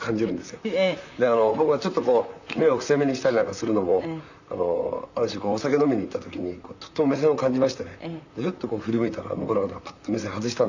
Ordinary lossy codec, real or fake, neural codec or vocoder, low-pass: none; fake; vocoder, 44.1 kHz, 128 mel bands, Pupu-Vocoder; 7.2 kHz